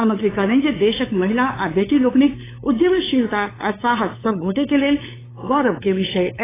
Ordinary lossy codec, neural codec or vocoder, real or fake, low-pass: AAC, 16 kbps; codec, 16 kHz, 8 kbps, FunCodec, trained on LibriTTS, 25 frames a second; fake; 3.6 kHz